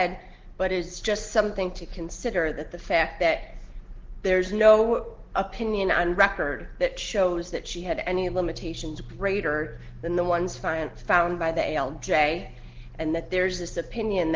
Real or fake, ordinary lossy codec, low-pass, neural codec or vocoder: real; Opus, 16 kbps; 7.2 kHz; none